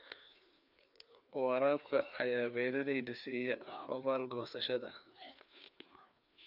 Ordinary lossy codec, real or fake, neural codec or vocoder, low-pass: none; fake; codec, 16 kHz, 2 kbps, FreqCodec, larger model; 5.4 kHz